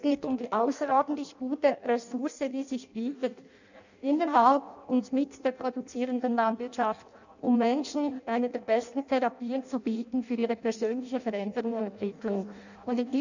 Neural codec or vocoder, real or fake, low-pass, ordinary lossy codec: codec, 16 kHz in and 24 kHz out, 0.6 kbps, FireRedTTS-2 codec; fake; 7.2 kHz; none